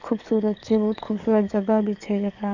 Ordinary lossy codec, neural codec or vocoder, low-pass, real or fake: none; codec, 44.1 kHz, 7.8 kbps, DAC; 7.2 kHz; fake